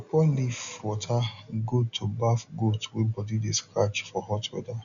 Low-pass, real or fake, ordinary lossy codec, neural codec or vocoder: 7.2 kHz; real; none; none